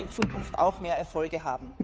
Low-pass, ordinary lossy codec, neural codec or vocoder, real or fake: none; none; codec, 16 kHz, 2 kbps, FunCodec, trained on Chinese and English, 25 frames a second; fake